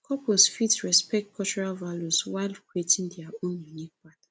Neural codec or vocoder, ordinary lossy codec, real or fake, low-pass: none; none; real; none